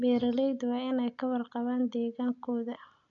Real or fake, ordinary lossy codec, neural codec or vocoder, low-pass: real; none; none; 7.2 kHz